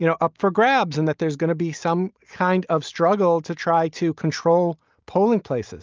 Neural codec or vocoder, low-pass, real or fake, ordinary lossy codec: none; 7.2 kHz; real; Opus, 24 kbps